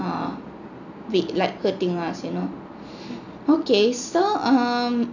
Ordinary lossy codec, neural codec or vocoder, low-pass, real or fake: none; none; 7.2 kHz; real